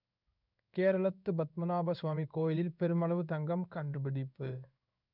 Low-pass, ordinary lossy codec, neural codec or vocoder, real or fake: 5.4 kHz; none; codec, 16 kHz in and 24 kHz out, 1 kbps, XY-Tokenizer; fake